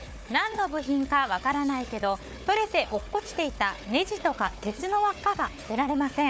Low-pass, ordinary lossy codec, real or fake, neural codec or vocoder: none; none; fake; codec, 16 kHz, 4 kbps, FunCodec, trained on Chinese and English, 50 frames a second